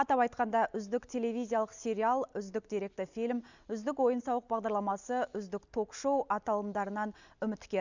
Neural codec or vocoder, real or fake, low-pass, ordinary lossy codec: none; real; 7.2 kHz; none